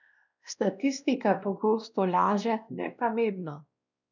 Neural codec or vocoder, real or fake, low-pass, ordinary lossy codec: codec, 16 kHz, 1 kbps, X-Codec, WavLM features, trained on Multilingual LibriSpeech; fake; 7.2 kHz; none